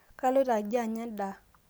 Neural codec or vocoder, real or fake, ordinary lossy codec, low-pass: none; real; none; none